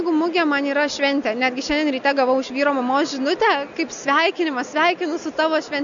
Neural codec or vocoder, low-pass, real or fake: none; 7.2 kHz; real